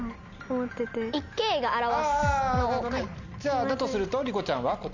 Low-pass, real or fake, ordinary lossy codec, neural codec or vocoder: 7.2 kHz; real; Opus, 64 kbps; none